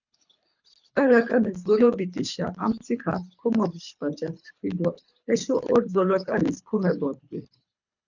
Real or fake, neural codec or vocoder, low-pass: fake; codec, 24 kHz, 3 kbps, HILCodec; 7.2 kHz